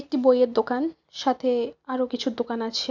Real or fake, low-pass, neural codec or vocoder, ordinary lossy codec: real; 7.2 kHz; none; none